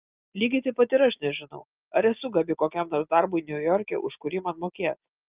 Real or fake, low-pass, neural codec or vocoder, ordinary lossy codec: real; 3.6 kHz; none; Opus, 32 kbps